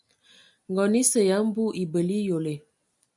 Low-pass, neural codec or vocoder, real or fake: 10.8 kHz; none; real